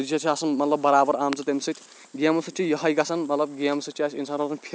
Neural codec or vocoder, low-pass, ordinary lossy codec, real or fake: none; none; none; real